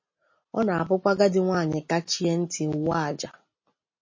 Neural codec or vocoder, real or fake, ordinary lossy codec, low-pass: none; real; MP3, 32 kbps; 7.2 kHz